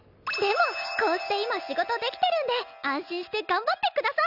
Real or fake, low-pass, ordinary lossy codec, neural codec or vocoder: real; 5.4 kHz; none; none